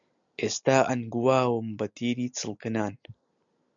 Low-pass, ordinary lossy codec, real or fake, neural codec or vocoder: 7.2 kHz; MP3, 96 kbps; real; none